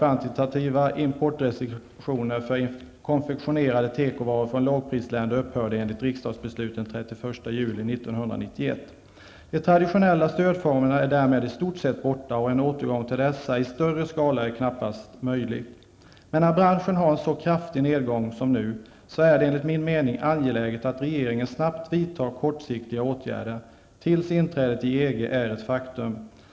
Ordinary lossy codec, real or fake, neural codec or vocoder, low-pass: none; real; none; none